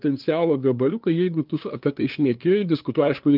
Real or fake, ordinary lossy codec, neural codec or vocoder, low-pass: fake; Opus, 24 kbps; codec, 16 kHz, 2 kbps, FunCodec, trained on LibriTTS, 25 frames a second; 5.4 kHz